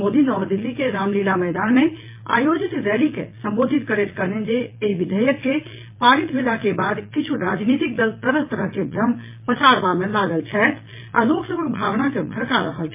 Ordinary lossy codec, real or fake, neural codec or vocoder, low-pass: MP3, 24 kbps; fake; vocoder, 22.05 kHz, 80 mel bands, Vocos; 3.6 kHz